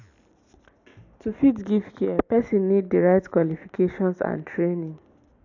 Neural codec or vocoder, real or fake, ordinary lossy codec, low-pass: none; real; AAC, 48 kbps; 7.2 kHz